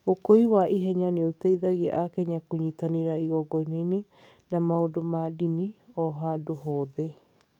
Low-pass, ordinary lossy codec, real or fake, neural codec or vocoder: 19.8 kHz; none; fake; codec, 44.1 kHz, 7.8 kbps, DAC